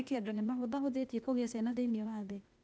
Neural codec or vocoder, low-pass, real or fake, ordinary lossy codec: codec, 16 kHz, 0.8 kbps, ZipCodec; none; fake; none